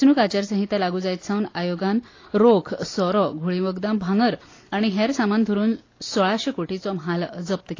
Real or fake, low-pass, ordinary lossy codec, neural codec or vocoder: real; 7.2 kHz; AAC, 32 kbps; none